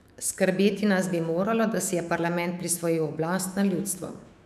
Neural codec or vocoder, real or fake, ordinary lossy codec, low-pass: autoencoder, 48 kHz, 128 numbers a frame, DAC-VAE, trained on Japanese speech; fake; none; 14.4 kHz